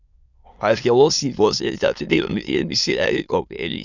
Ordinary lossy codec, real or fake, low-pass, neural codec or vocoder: none; fake; 7.2 kHz; autoencoder, 22.05 kHz, a latent of 192 numbers a frame, VITS, trained on many speakers